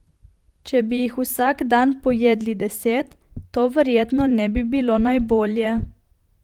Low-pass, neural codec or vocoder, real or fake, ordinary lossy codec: 19.8 kHz; vocoder, 44.1 kHz, 128 mel bands, Pupu-Vocoder; fake; Opus, 24 kbps